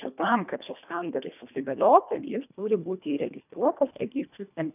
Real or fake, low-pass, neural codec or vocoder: fake; 3.6 kHz; codec, 24 kHz, 1.5 kbps, HILCodec